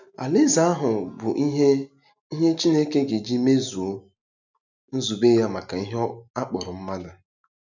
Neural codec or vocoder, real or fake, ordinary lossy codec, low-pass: none; real; none; 7.2 kHz